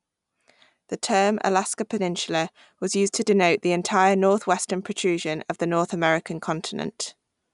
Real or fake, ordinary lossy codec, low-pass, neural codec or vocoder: real; none; 10.8 kHz; none